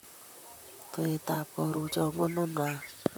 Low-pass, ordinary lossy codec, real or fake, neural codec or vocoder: none; none; fake; vocoder, 44.1 kHz, 128 mel bands, Pupu-Vocoder